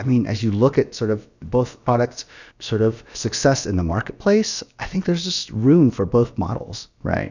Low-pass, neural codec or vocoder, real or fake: 7.2 kHz; codec, 16 kHz, about 1 kbps, DyCAST, with the encoder's durations; fake